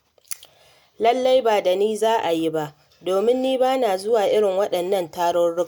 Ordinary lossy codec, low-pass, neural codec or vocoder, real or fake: none; none; none; real